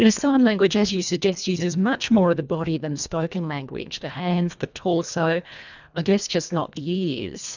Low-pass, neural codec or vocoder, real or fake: 7.2 kHz; codec, 24 kHz, 1.5 kbps, HILCodec; fake